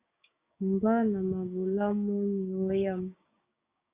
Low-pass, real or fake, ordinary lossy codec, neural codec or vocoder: 3.6 kHz; fake; Opus, 64 kbps; codec, 16 kHz, 6 kbps, DAC